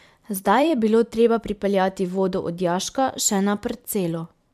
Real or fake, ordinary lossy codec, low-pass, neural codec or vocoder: fake; MP3, 96 kbps; 14.4 kHz; vocoder, 44.1 kHz, 128 mel bands every 256 samples, BigVGAN v2